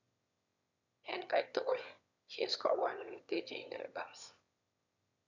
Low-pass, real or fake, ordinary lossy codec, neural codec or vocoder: 7.2 kHz; fake; none; autoencoder, 22.05 kHz, a latent of 192 numbers a frame, VITS, trained on one speaker